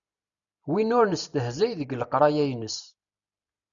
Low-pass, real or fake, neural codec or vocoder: 7.2 kHz; real; none